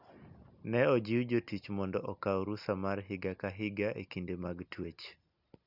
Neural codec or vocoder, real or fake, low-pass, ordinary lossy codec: none; real; 5.4 kHz; none